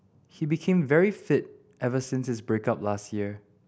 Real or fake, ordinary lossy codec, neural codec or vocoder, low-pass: real; none; none; none